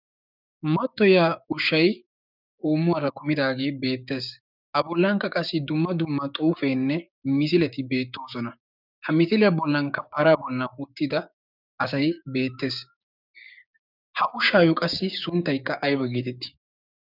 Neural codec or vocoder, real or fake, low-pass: codec, 16 kHz, 6 kbps, DAC; fake; 5.4 kHz